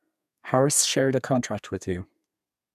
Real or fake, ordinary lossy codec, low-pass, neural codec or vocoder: fake; none; 14.4 kHz; codec, 32 kHz, 1.9 kbps, SNAC